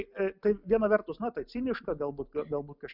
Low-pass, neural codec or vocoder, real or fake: 5.4 kHz; none; real